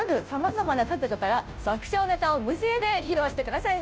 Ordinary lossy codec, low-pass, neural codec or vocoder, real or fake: none; none; codec, 16 kHz, 0.5 kbps, FunCodec, trained on Chinese and English, 25 frames a second; fake